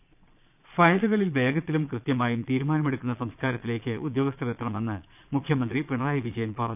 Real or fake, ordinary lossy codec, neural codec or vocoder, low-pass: fake; none; vocoder, 22.05 kHz, 80 mel bands, Vocos; 3.6 kHz